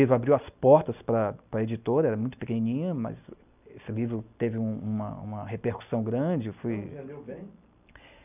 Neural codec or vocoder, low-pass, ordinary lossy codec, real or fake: none; 3.6 kHz; none; real